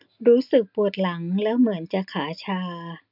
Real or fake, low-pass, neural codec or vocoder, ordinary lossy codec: real; 5.4 kHz; none; none